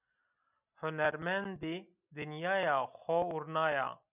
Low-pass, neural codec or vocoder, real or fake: 3.6 kHz; none; real